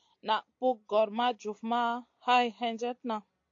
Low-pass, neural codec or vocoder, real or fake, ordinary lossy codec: 7.2 kHz; none; real; AAC, 48 kbps